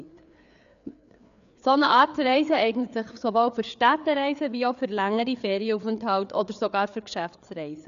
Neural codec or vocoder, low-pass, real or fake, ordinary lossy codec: codec, 16 kHz, 4 kbps, FreqCodec, larger model; 7.2 kHz; fake; none